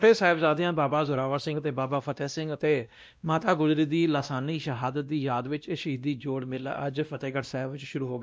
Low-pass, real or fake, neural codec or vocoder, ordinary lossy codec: none; fake; codec, 16 kHz, 1 kbps, X-Codec, WavLM features, trained on Multilingual LibriSpeech; none